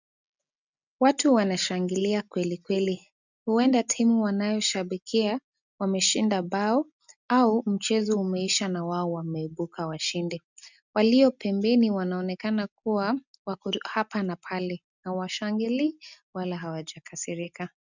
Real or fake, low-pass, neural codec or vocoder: real; 7.2 kHz; none